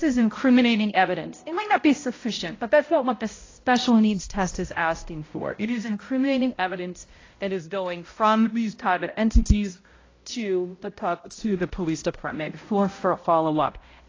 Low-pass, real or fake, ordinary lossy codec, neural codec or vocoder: 7.2 kHz; fake; AAC, 32 kbps; codec, 16 kHz, 0.5 kbps, X-Codec, HuBERT features, trained on balanced general audio